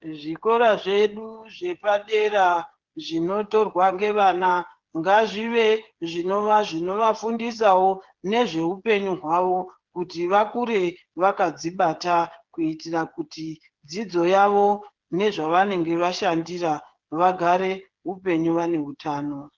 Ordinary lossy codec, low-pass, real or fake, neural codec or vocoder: Opus, 16 kbps; 7.2 kHz; fake; codec, 16 kHz, 8 kbps, FreqCodec, smaller model